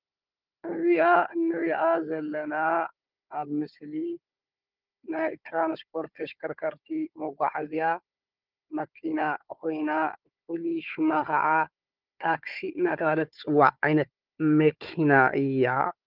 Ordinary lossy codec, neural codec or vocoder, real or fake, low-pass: Opus, 24 kbps; codec, 16 kHz, 4 kbps, FunCodec, trained on Chinese and English, 50 frames a second; fake; 5.4 kHz